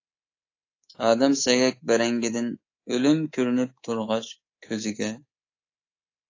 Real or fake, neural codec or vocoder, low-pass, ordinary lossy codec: fake; codec, 24 kHz, 3.1 kbps, DualCodec; 7.2 kHz; AAC, 32 kbps